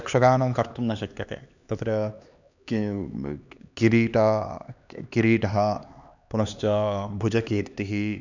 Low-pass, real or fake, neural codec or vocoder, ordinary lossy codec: 7.2 kHz; fake; codec, 16 kHz, 2 kbps, X-Codec, HuBERT features, trained on LibriSpeech; none